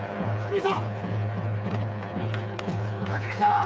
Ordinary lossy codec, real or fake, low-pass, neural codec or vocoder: none; fake; none; codec, 16 kHz, 4 kbps, FreqCodec, smaller model